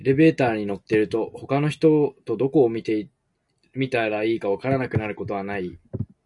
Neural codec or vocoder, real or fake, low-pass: none; real; 10.8 kHz